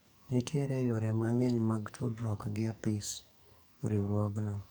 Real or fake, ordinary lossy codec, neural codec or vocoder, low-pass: fake; none; codec, 44.1 kHz, 2.6 kbps, SNAC; none